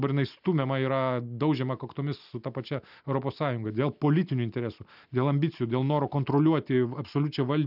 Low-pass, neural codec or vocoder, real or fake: 5.4 kHz; none; real